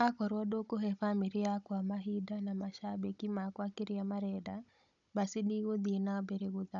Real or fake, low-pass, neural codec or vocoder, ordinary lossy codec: fake; 7.2 kHz; codec, 16 kHz, 16 kbps, FunCodec, trained on Chinese and English, 50 frames a second; none